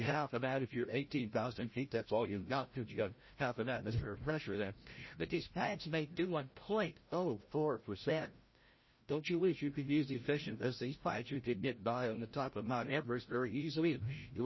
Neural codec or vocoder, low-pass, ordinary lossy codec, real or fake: codec, 16 kHz, 0.5 kbps, FreqCodec, larger model; 7.2 kHz; MP3, 24 kbps; fake